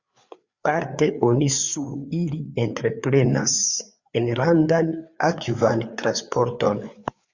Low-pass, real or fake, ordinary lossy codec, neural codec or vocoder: 7.2 kHz; fake; Opus, 64 kbps; codec, 16 kHz, 4 kbps, FreqCodec, larger model